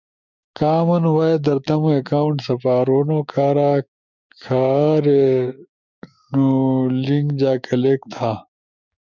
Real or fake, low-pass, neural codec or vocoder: fake; 7.2 kHz; codec, 16 kHz, 6 kbps, DAC